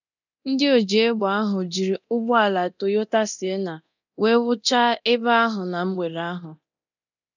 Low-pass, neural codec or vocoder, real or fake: 7.2 kHz; codec, 24 kHz, 0.9 kbps, DualCodec; fake